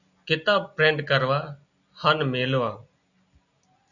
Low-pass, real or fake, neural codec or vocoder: 7.2 kHz; real; none